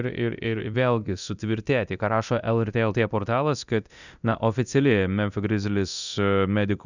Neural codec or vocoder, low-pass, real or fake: codec, 24 kHz, 0.9 kbps, DualCodec; 7.2 kHz; fake